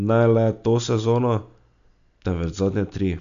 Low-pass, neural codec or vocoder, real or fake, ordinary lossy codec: 7.2 kHz; none; real; MP3, 64 kbps